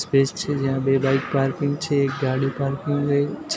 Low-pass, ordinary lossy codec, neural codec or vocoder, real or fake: none; none; none; real